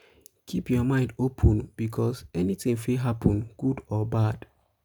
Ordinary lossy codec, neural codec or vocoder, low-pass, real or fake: none; none; none; real